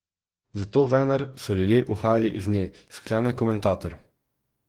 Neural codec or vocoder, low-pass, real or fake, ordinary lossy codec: codec, 44.1 kHz, 2.6 kbps, DAC; 19.8 kHz; fake; Opus, 24 kbps